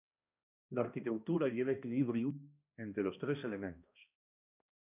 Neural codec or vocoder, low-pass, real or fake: codec, 16 kHz, 1 kbps, X-Codec, HuBERT features, trained on balanced general audio; 3.6 kHz; fake